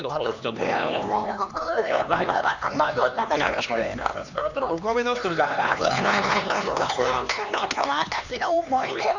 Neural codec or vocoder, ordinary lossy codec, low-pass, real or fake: codec, 16 kHz, 2 kbps, X-Codec, HuBERT features, trained on LibriSpeech; none; 7.2 kHz; fake